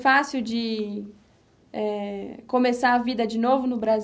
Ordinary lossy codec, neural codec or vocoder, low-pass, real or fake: none; none; none; real